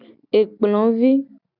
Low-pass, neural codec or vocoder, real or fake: 5.4 kHz; none; real